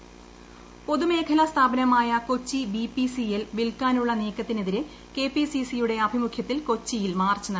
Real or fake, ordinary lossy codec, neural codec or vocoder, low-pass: real; none; none; none